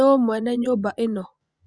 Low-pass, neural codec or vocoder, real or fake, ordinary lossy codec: 9.9 kHz; vocoder, 24 kHz, 100 mel bands, Vocos; fake; Opus, 64 kbps